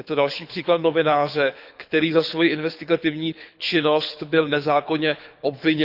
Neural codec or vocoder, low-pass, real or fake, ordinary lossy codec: codec, 24 kHz, 6 kbps, HILCodec; 5.4 kHz; fake; Opus, 64 kbps